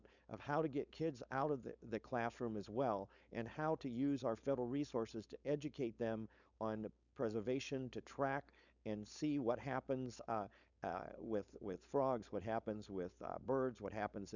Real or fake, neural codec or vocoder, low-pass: fake; codec, 16 kHz, 4.8 kbps, FACodec; 7.2 kHz